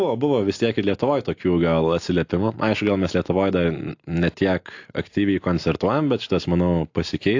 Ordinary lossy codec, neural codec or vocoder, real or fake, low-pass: AAC, 48 kbps; none; real; 7.2 kHz